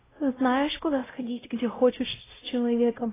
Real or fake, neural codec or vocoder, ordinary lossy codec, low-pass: fake; codec, 16 kHz, 0.5 kbps, X-Codec, WavLM features, trained on Multilingual LibriSpeech; AAC, 16 kbps; 3.6 kHz